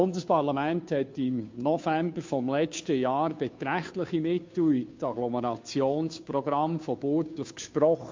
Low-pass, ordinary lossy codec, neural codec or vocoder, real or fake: 7.2 kHz; MP3, 48 kbps; codec, 16 kHz, 2 kbps, FunCodec, trained on Chinese and English, 25 frames a second; fake